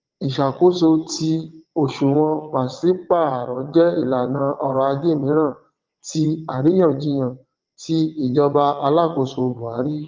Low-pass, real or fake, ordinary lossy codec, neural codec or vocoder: 7.2 kHz; fake; Opus, 32 kbps; vocoder, 22.05 kHz, 80 mel bands, WaveNeXt